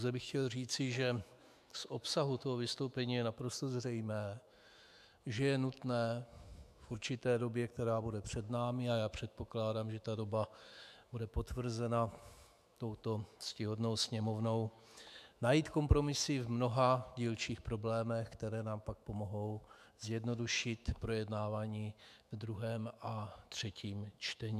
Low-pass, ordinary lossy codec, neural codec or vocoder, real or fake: 14.4 kHz; MP3, 96 kbps; autoencoder, 48 kHz, 128 numbers a frame, DAC-VAE, trained on Japanese speech; fake